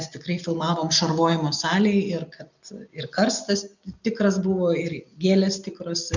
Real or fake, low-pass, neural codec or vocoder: real; 7.2 kHz; none